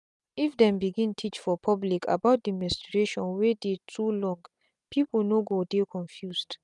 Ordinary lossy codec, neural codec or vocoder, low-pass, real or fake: none; none; 10.8 kHz; real